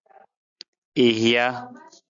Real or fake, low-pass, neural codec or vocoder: real; 7.2 kHz; none